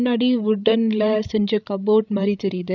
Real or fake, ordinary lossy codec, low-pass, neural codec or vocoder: fake; none; 7.2 kHz; codec, 16 kHz, 16 kbps, FreqCodec, larger model